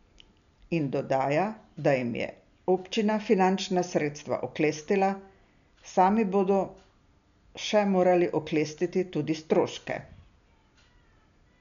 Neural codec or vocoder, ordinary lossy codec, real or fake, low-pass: none; none; real; 7.2 kHz